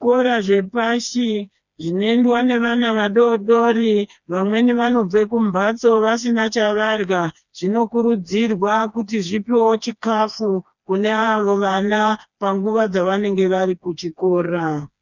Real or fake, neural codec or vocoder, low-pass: fake; codec, 16 kHz, 2 kbps, FreqCodec, smaller model; 7.2 kHz